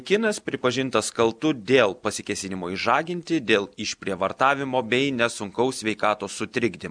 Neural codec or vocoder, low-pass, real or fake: none; 9.9 kHz; real